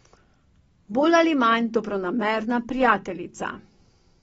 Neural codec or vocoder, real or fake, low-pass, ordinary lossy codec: none; real; 10.8 kHz; AAC, 24 kbps